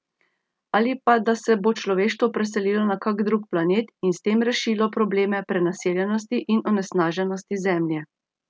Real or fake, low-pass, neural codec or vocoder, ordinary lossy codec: real; none; none; none